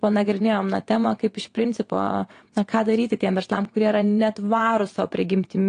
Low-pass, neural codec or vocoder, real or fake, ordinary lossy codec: 9.9 kHz; vocoder, 22.05 kHz, 80 mel bands, WaveNeXt; fake; AAC, 48 kbps